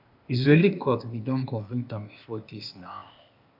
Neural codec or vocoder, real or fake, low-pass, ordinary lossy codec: codec, 16 kHz, 0.8 kbps, ZipCodec; fake; 5.4 kHz; MP3, 48 kbps